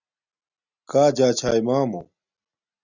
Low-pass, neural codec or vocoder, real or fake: 7.2 kHz; none; real